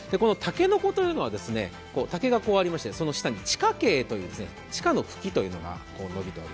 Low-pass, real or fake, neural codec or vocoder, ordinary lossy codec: none; real; none; none